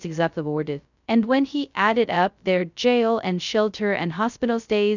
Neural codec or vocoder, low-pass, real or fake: codec, 16 kHz, 0.2 kbps, FocalCodec; 7.2 kHz; fake